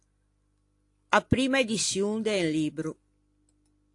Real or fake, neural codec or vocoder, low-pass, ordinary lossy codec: real; none; 10.8 kHz; AAC, 48 kbps